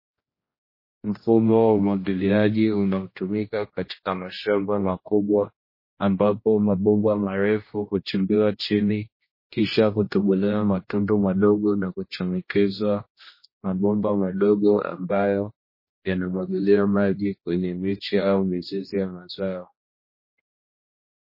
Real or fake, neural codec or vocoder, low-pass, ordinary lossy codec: fake; codec, 16 kHz, 1 kbps, X-Codec, HuBERT features, trained on general audio; 5.4 kHz; MP3, 24 kbps